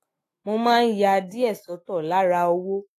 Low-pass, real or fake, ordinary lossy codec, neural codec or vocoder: 14.4 kHz; fake; AAC, 48 kbps; autoencoder, 48 kHz, 128 numbers a frame, DAC-VAE, trained on Japanese speech